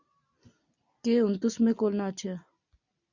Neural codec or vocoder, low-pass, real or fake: none; 7.2 kHz; real